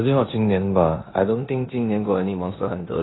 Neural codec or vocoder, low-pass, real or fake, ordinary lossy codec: codec, 16 kHz in and 24 kHz out, 0.9 kbps, LongCat-Audio-Codec, four codebook decoder; 7.2 kHz; fake; AAC, 16 kbps